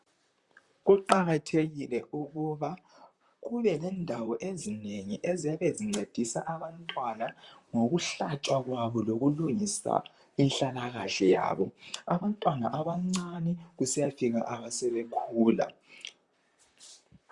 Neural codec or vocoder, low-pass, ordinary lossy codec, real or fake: vocoder, 44.1 kHz, 128 mel bands, Pupu-Vocoder; 10.8 kHz; Opus, 64 kbps; fake